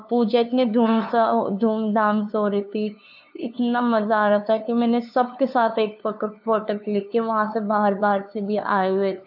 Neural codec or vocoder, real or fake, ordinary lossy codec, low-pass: codec, 16 kHz, 2 kbps, FunCodec, trained on LibriTTS, 25 frames a second; fake; AAC, 48 kbps; 5.4 kHz